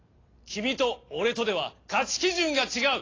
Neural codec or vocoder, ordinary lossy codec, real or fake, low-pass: none; AAC, 32 kbps; real; 7.2 kHz